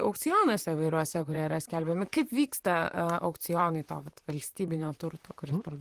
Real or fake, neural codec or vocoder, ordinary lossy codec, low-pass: fake; vocoder, 44.1 kHz, 128 mel bands, Pupu-Vocoder; Opus, 24 kbps; 14.4 kHz